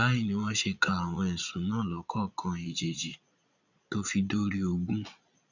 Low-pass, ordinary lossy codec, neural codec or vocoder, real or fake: 7.2 kHz; none; vocoder, 22.05 kHz, 80 mel bands, Vocos; fake